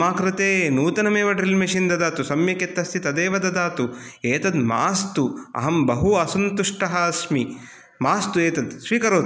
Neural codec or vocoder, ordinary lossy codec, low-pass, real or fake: none; none; none; real